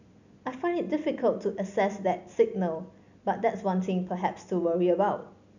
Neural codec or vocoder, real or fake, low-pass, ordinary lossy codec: none; real; 7.2 kHz; none